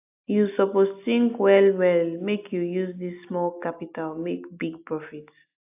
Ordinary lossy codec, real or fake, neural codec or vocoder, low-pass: AAC, 32 kbps; real; none; 3.6 kHz